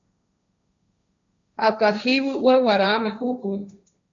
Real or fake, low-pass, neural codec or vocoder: fake; 7.2 kHz; codec, 16 kHz, 1.1 kbps, Voila-Tokenizer